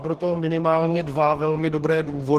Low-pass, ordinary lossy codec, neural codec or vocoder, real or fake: 14.4 kHz; Opus, 16 kbps; codec, 44.1 kHz, 2.6 kbps, DAC; fake